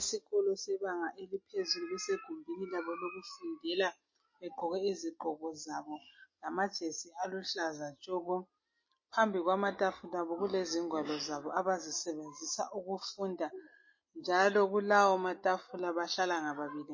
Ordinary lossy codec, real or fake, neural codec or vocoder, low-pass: MP3, 32 kbps; real; none; 7.2 kHz